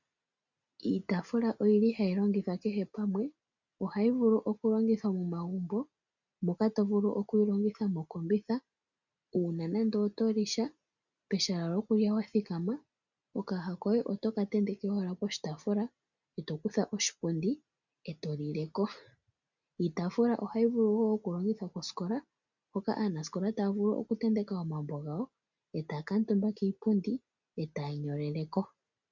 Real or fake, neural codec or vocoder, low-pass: real; none; 7.2 kHz